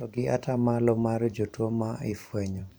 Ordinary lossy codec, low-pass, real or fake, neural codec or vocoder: none; none; fake; vocoder, 44.1 kHz, 128 mel bands every 512 samples, BigVGAN v2